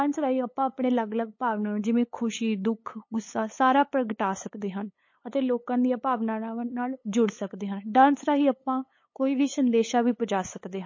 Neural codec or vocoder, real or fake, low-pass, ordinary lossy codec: codec, 16 kHz, 8 kbps, FunCodec, trained on LibriTTS, 25 frames a second; fake; 7.2 kHz; MP3, 32 kbps